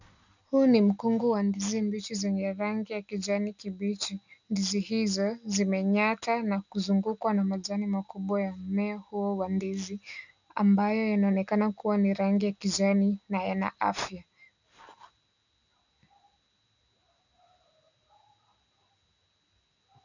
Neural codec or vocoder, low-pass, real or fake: none; 7.2 kHz; real